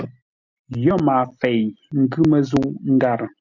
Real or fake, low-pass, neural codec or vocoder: real; 7.2 kHz; none